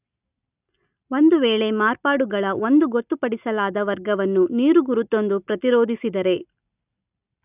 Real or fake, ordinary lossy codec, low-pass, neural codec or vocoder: real; none; 3.6 kHz; none